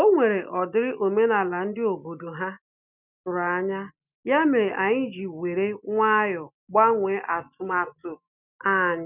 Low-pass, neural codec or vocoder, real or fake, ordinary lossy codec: 3.6 kHz; none; real; none